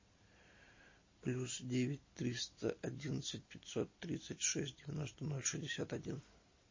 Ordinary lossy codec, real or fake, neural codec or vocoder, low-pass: MP3, 32 kbps; real; none; 7.2 kHz